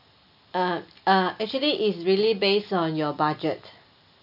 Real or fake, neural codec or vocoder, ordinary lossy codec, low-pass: real; none; none; 5.4 kHz